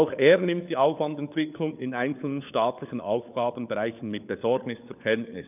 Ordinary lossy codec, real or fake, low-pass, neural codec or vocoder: none; fake; 3.6 kHz; codec, 16 kHz, 4 kbps, FunCodec, trained on LibriTTS, 50 frames a second